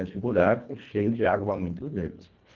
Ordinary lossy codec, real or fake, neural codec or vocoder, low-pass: Opus, 32 kbps; fake; codec, 24 kHz, 1.5 kbps, HILCodec; 7.2 kHz